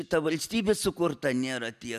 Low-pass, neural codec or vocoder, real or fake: 14.4 kHz; none; real